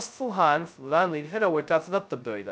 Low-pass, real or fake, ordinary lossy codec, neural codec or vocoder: none; fake; none; codec, 16 kHz, 0.2 kbps, FocalCodec